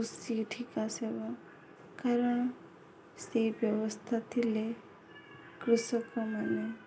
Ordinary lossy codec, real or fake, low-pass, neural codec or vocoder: none; real; none; none